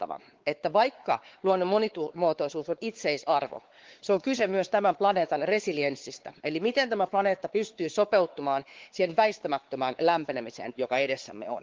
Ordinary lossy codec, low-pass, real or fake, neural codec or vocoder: Opus, 16 kbps; 7.2 kHz; fake; codec, 16 kHz, 4 kbps, X-Codec, WavLM features, trained on Multilingual LibriSpeech